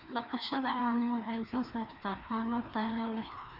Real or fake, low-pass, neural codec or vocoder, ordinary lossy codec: fake; 5.4 kHz; codec, 16 kHz, 2 kbps, FreqCodec, larger model; none